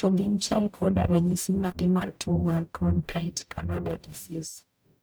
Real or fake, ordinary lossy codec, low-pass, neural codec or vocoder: fake; none; none; codec, 44.1 kHz, 0.9 kbps, DAC